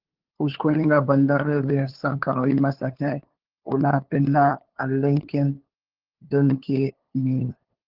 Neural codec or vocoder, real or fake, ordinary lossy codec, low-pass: codec, 16 kHz, 2 kbps, FunCodec, trained on LibriTTS, 25 frames a second; fake; Opus, 16 kbps; 5.4 kHz